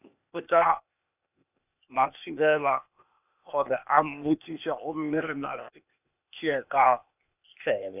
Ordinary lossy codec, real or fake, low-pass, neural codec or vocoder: none; fake; 3.6 kHz; codec, 16 kHz, 0.8 kbps, ZipCodec